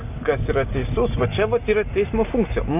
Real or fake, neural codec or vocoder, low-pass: real; none; 3.6 kHz